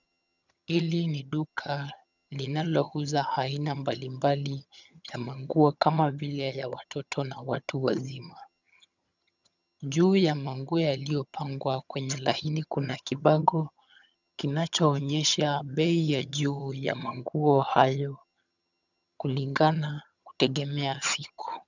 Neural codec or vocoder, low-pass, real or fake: vocoder, 22.05 kHz, 80 mel bands, HiFi-GAN; 7.2 kHz; fake